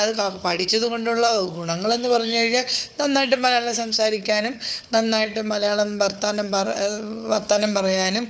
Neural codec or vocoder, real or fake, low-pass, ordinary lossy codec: codec, 16 kHz, 4 kbps, FunCodec, trained on Chinese and English, 50 frames a second; fake; none; none